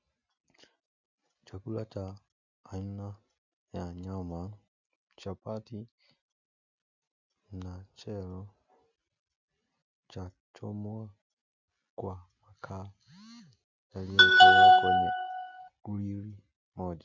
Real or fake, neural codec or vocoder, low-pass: real; none; 7.2 kHz